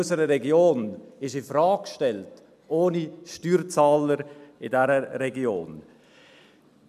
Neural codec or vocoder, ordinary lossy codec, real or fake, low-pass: none; none; real; 14.4 kHz